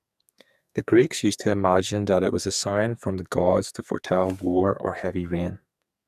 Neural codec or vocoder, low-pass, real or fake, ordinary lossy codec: codec, 44.1 kHz, 2.6 kbps, SNAC; 14.4 kHz; fake; none